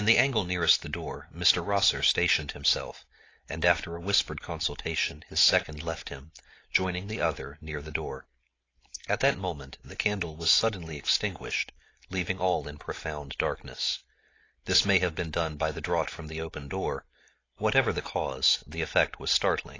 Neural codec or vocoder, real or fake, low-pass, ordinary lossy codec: none; real; 7.2 kHz; AAC, 32 kbps